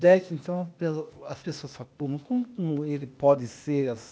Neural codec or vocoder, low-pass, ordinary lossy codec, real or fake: codec, 16 kHz, 0.8 kbps, ZipCodec; none; none; fake